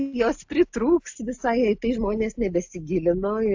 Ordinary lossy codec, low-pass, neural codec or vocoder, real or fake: AAC, 48 kbps; 7.2 kHz; none; real